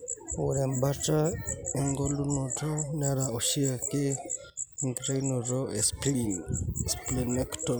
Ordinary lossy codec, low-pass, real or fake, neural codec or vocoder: none; none; real; none